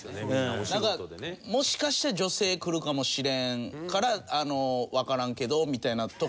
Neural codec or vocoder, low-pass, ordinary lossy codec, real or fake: none; none; none; real